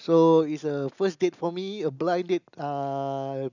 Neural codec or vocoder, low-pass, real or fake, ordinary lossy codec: none; 7.2 kHz; real; none